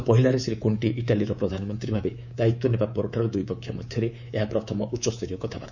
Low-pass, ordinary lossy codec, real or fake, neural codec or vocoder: 7.2 kHz; none; fake; codec, 24 kHz, 3.1 kbps, DualCodec